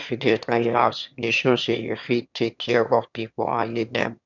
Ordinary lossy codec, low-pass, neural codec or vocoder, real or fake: none; 7.2 kHz; autoencoder, 22.05 kHz, a latent of 192 numbers a frame, VITS, trained on one speaker; fake